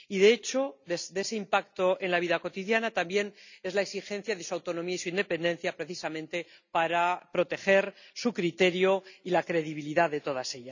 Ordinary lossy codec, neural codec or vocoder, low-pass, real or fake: none; none; 7.2 kHz; real